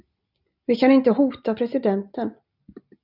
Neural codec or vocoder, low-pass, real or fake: none; 5.4 kHz; real